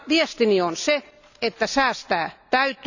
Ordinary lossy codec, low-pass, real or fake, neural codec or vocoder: none; 7.2 kHz; real; none